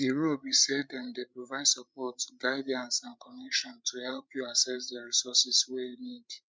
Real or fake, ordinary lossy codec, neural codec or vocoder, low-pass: fake; none; codec, 16 kHz, 16 kbps, FreqCodec, larger model; none